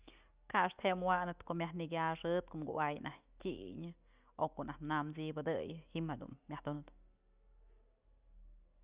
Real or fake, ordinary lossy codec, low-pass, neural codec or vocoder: real; Opus, 64 kbps; 3.6 kHz; none